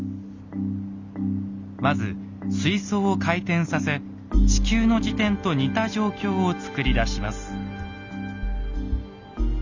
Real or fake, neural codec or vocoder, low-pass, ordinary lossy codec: real; none; 7.2 kHz; Opus, 64 kbps